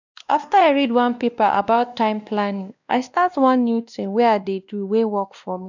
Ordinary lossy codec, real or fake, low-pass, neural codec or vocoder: none; fake; 7.2 kHz; codec, 16 kHz, 1 kbps, X-Codec, WavLM features, trained on Multilingual LibriSpeech